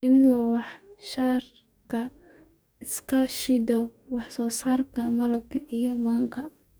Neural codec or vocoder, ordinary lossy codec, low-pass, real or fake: codec, 44.1 kHz, 2.6 kbps, DAC; none; none; fake